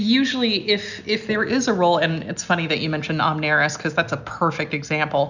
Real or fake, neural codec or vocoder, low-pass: real; none; 7.2 kHz